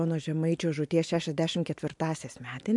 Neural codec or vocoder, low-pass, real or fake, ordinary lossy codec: none; 10.8 kHz; real; MP3, 64 kbps